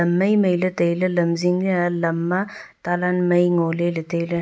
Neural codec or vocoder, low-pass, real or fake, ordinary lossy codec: none; none; real; none